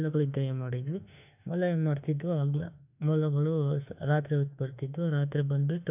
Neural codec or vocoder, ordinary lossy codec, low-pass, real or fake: autoencoder, 48 kHz, 32 numbers a frame, DAC-VAE, trained on Japanese speech; none; 3.6 kHz; fake